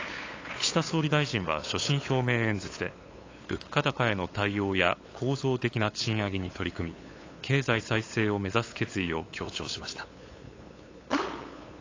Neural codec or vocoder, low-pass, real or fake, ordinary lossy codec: codec, 16 kHz, 8 kbps, FunCodec, trained on LibriTTS, 25 frames a second; 7.2 kHz; fake; AAC, 32 kbps